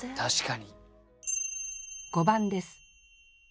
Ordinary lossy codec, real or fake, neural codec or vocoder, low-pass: none; real; none; none